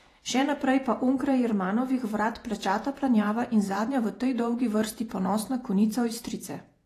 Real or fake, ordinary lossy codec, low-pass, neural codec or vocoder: fake; AAC, 48 kbps; 14.4 kHz; vocoder, 48 kHz, 128 mel bands, Vocos